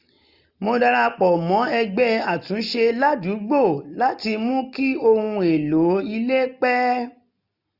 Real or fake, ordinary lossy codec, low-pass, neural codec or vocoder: real; none; 5.4 kHz; none